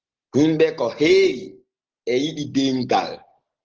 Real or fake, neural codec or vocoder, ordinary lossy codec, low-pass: real; none; Opus, 16 kbps; 7.2 kHz